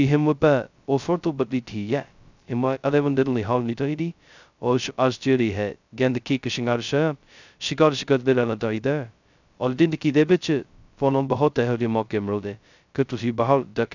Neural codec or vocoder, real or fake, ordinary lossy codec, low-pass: codec, 16 kHz, 0.2 kbps, FocalCodec; fake; none; 7.2 kHz